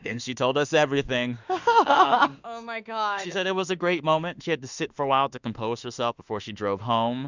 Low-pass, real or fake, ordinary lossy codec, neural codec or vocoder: 7.2 kHz; fake; Opus, 64 kbps; autoencoder, 48 kHz, 32 numbers a frame, DAC-VAE, trained on Japanese speech